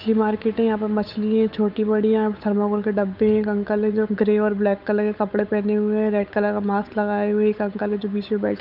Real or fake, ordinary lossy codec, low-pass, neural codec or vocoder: fake; none; 5.4 kHz; codec, 16 kHz, 8 kbps, FunCodec, trained on Chinese and English, 25 frames a second